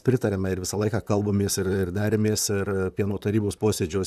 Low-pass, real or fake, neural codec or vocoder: 14.4 kHz; fake; vocoder, 44.1 kHz, 128 mel bands, Pupu-Vocoder